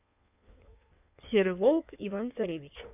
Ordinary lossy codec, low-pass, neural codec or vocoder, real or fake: none; 3.6 kHz; codec, 16 kHz in and 24 kHz out, 1.1 kbps, FireRedTTS-2 codec; fake